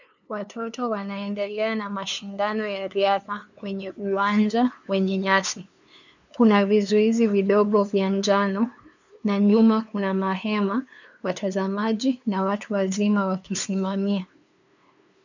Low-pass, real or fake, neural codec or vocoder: 7.2 kHz; fake; codec, 16 kHz, 2 kbps, FunCodec, trained on LibriTTS, 25 frames a second